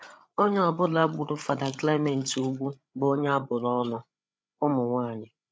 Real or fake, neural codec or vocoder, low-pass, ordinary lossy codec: fake; codec, 16 kHz, 8 kbps, FreqCodec, larger model; none; none